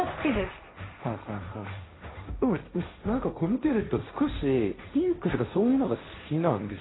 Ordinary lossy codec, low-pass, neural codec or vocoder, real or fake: AAC, 16 kbps; 7.2 kHz; codec, 16 kHz, 1.1 kbps, Voila-Tokenizer; fake